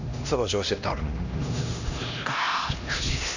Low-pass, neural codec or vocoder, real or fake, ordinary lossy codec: 7.2 kHz; codec, 16 kHz, 1 kbps, X-Codec, HuBERT features, trained on LibriSpeech; fake; none